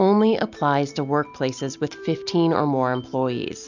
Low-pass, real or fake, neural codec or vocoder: 7.2 kHz; real; none